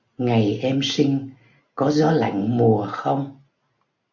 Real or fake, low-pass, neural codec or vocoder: real; 7.2 kHz; none